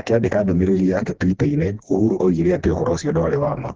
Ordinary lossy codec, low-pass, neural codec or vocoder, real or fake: Opus, 16 kbps; 7.2 kHz; codec, 16 kHz, 2 kbps, FreqCodec, smaller model; fake